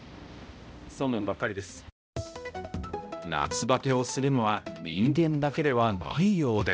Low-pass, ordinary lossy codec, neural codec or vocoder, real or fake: none; none; codec, 16 kHz, 0.5 kbps, X-Codec, HuBERT features, trained on balanced general audio; fake